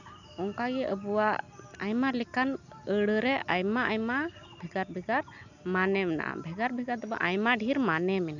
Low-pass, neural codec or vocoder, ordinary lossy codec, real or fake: 7.2 kHz; none; none; real